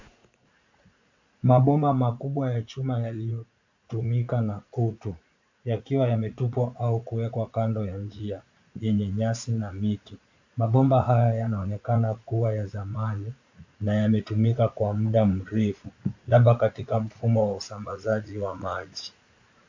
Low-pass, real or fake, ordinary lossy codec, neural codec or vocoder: 7.2 kHz; fake; AAC, 48 kbps; vocoder, 44.1 kHz, 80 mel bands, Vocos